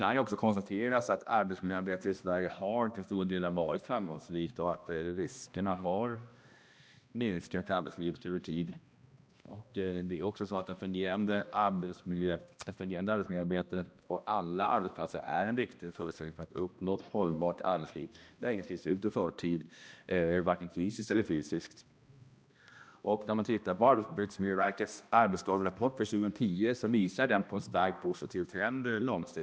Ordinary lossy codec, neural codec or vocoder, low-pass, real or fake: none; codec, 16 kHz, 1 kbps, X-Codec, HuBERT features, trained on balanced general audio; none; fake